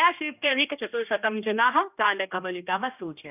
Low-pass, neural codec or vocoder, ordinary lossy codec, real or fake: 3.6 kHz; codec, 16 kHz, 1 kbps, X-Codec, HuBERT features, trained on general audio; none; fake